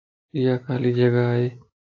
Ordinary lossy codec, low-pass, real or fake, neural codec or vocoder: AAC, 32 kbps; 7.2 kHz; real; none